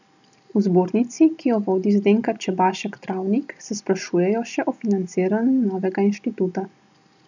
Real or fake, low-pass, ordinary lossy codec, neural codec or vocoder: real; 7.2 kHz; none; none